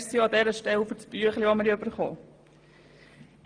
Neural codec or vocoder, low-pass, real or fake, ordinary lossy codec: none; 9.9 kHz; real; Opus, 32 kbps